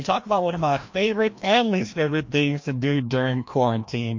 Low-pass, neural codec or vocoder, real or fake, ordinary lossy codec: 7.2 kHz; codec, 16 kHz, 1 kbps, FreqCodec, larger model; fake; MP3, 48 kbps